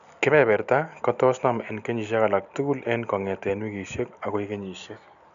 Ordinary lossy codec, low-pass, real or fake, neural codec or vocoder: AAC, 96 kbps; 7.2 kHz; real; none